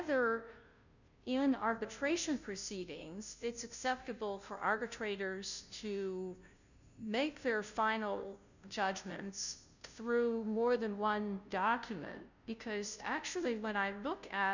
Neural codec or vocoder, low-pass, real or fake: codec, 16 kHz, 0.5 kbps, FunCodec, trained on Chinese and English, 25 frames a second; 7.2 kHz; fake